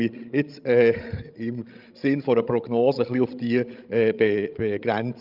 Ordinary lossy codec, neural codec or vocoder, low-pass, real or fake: Opus, 24 kbps; codec, 16 kHz, 16 kbps, FreqCodec, larger model; 5.4 kHz; fake